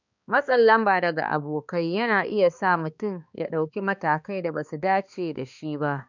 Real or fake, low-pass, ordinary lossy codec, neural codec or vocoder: fake; 7.2 kHz; none; codec, 16 kHz, 2 kbps, X-Codec, HuBERT features, trained on balanced general audio